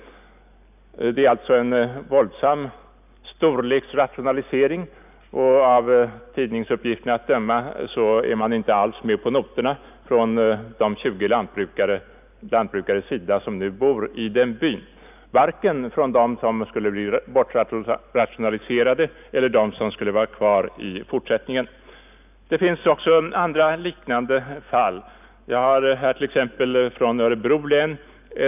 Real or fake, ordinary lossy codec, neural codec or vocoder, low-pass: real; none; none; 3.6 kHz